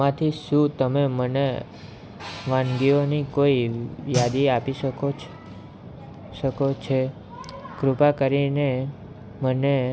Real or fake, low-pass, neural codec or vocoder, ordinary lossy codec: real; none; none; none